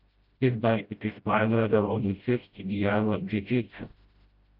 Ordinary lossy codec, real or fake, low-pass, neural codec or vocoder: Opus, 24 kbps; fake; 5.4 kHz; codec, 16 kHz, 0.5 kbps, FreqCodec, smaller model